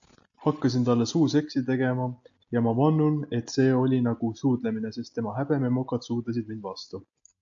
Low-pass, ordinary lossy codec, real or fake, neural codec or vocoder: 7.2 kHz; AAC, 64 kbps; real; none